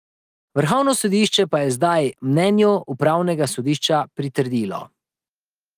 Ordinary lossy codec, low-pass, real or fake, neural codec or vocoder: Opus, 24 kbps; 14.4 kHz; real; none